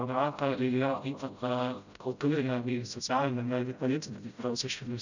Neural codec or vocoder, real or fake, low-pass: codec, 16 kHz, 0.5 kbps, FreqCodec, smaller model; fake; 7.2 kHz